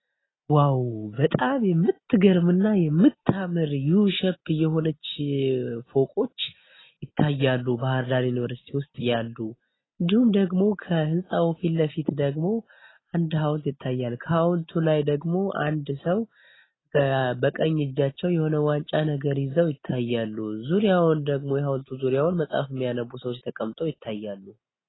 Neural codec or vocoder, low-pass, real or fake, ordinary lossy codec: none; 7.2 kHz; real; AAC, 16 kbps